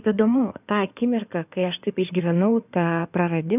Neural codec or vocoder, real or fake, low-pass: codec, 16 kHz in and 24 kHz out, 2.2 kbps, FireRedTTS-2 codec; fake; 3.6 kHz